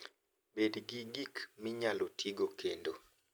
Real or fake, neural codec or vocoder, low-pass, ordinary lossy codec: real; none; none; none